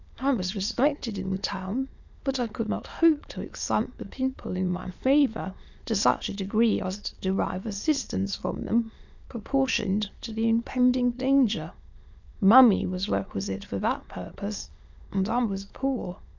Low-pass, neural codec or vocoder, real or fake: 7.2 kHz; autoencoder, 22.05 kHz, a latent of 192 numbers a frame, VITS, trained on many speakers; fake